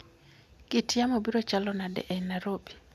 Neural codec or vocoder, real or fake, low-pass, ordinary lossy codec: none; real; 14.4 kHz; none